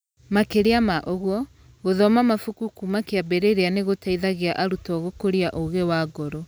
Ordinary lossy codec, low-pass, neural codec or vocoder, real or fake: none; none; none; real